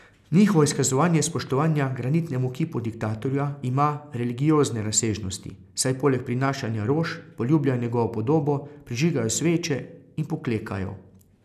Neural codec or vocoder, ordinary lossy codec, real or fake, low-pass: none; none; real; 14.4 kHz